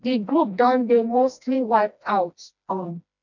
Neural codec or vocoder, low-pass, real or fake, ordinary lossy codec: codec, 16 kHz, 1 kbps, FreqCodec, smaller model; 7.2 kHz; fake; none